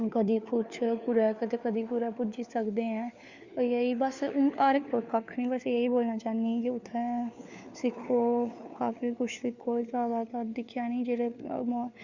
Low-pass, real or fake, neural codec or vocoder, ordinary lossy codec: 7.2 kHz; fake; codec, 16 kHz, 4 kbps, FunCodec, trained on LibriTTS, 50 frames a second; Opus, 64 kbps